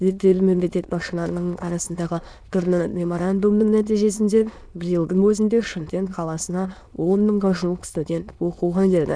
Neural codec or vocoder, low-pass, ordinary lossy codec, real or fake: autoencoder, 22.05 kHz, a latent of 192 numbers a frame, VITS, trained on many speakers; none; none; fake